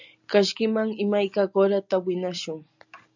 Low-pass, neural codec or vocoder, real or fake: 7.2 kHz; none; real